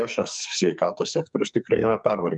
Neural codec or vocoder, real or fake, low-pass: codec, 44.1 kHz, 2.6 kbps, SNAC; fake; 10.8 kHz